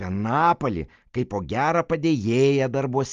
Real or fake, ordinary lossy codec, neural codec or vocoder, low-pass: real; Opus, 32 kbps; none; 7.2 kHz